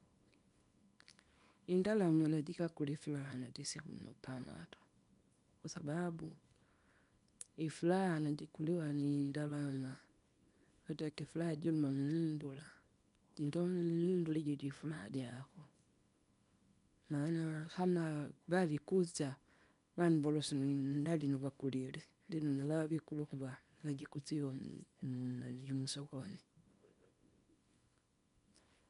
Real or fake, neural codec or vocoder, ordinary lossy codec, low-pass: fake; codec, 24 kHz, 0.9 kbps, WavTokenizer, small release; none; 10.8 kHz